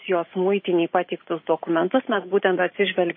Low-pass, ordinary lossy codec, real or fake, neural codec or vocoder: 7.2 kHz; MP3, 24 kbps; fake; vocoder, 44.1 kHz, 128 mel bands every 512 samples, BigVGAN v2